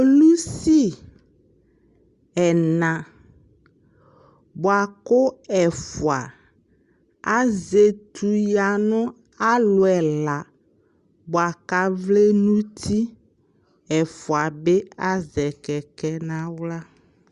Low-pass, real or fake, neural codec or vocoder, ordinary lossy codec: 10.8 kHz; real; none; Opus, 64 kbps